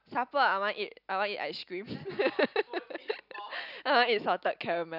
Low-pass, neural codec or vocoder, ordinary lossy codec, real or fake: 5.4 kHz; none; none; real